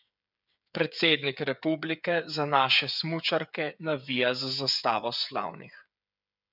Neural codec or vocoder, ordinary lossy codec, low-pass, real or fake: codec, 16 kHz, 8 kbps, FreqCodec, smaller model; none; 5.4 kHz; fake